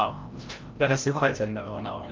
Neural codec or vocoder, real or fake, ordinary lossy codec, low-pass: codec, 16 kHz, 0.5 kbps, FreqCodec, larger model; fake; Opus, 32 kbps; 7.2 kHz